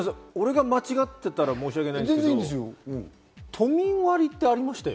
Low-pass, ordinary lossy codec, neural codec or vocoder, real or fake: none; none; none; real